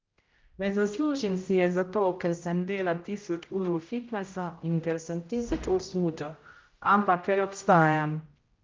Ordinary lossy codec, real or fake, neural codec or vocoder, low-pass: Opus, 32 kbps; fake; codec, 16 kHz, 0.5 kbps, X-Codec, HuBERT features, trained on general audio; 7.2 kHz